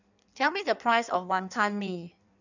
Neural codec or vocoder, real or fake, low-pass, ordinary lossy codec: codec, 16 kHz in and 24 kHz out, 1.1 kbps, FireRedTTS-2 codec; fake; 7.2 kHz; none